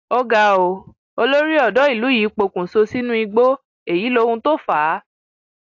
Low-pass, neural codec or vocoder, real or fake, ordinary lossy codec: 7.2 kHz; none; real; AAC, 48 kbps